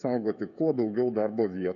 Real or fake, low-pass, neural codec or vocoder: fake; 7.2 kHz; codec, 16 kHz, 16 kbps, FreqCodec, smaller model